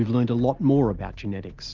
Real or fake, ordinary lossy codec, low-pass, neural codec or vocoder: real; Opus, 32 kbps; 7.2 kHz; none